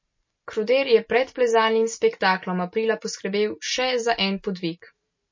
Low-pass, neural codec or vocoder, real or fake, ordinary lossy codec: 7.2 kHz; none; real; MP3, 32 kbps